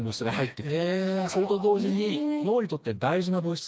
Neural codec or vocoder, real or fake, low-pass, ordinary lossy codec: codec, 16 kHz, 2 kbps, FreqCodec, smaller model; fake; none; none